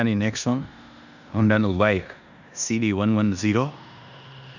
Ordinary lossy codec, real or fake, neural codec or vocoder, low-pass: none; fake; codec, 16 kHz in and 24 kHz out, 0.9 kbps, LongCat-Audio-Codec, four codebook decoder; 7.2 kHz